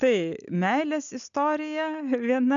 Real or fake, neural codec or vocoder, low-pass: real; none; 7.2 kHz